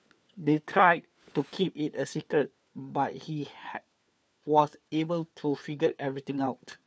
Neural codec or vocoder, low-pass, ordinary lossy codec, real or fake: codec, 16 kHz, 4 kbps, FunCodec, trained on LibriTTS, 50 frames a second; none; none; fake